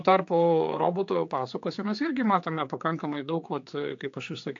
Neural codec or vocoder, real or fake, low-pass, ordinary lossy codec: codec, 16 kHz, 4 kbps, X-Codec, HuBERT features, trained on general audio; fake; 7.2 kHz; AAC, 48 kbps